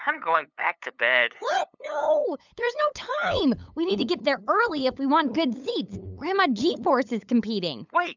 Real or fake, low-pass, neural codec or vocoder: fake; 7.2 kHz; codec, 16 kHz, 8 kbps, FunCodec, trained on LibriTTS, 25 frames a second